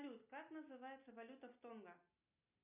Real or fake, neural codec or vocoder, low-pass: real; none; 3.6 kHz